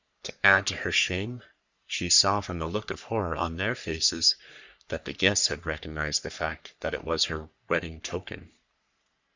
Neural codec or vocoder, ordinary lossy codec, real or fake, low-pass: codec, 44.1 kHz, 3.4 kbps, Pupu-Codec; Opus, 64 kbps; fake; 7.2 kHz